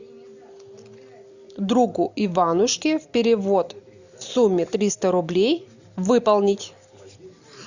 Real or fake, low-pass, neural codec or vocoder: real; 7.2 kHz; none